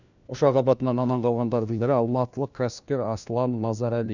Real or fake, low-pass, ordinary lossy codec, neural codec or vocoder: fake; 7.2 kHz; none; codec, 16 kHz, 1 kbps, FunCodec, trained on LibriTTS, 50 frames a second